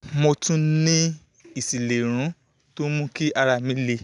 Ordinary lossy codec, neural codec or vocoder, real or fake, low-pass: none; none; real; 10.8 kHz